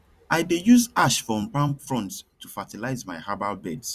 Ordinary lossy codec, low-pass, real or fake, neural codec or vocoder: Opus, 64 kbps; 14.4 kHz; fake; vocoder, 44.1 kHz, 128 mel bands every 256 samples, BigVGAN v2